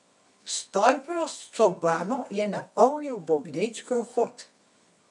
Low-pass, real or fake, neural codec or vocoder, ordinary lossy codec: 10.8 kHz; fake; codec, 24 kHz, 0.9 kbps, WavTokenizer, medium music audio release; none